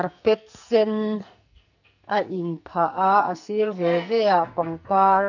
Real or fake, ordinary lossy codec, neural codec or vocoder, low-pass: fake; none; codec, 44.1 kHz, 2.6 kbps, SNAC; 7.2 kHz